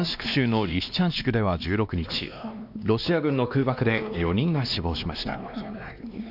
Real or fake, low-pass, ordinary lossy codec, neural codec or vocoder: fake; 5.4 kHz; none; codec, 16 kHz, 2 kbps, X-Codec, WavLM features, trained on Multilingual LibriSpeech